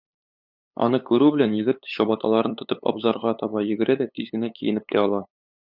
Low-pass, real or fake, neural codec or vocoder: 5.4 kHz; fake; codec, 16 kHz, 8 kbps, FunCodec, trained on LibriTTS, 25 frames a second